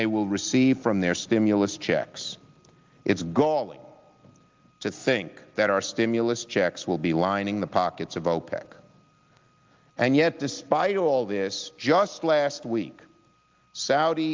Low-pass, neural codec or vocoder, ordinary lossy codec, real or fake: 7.2 kHz; none; Opus, 32 kbps; real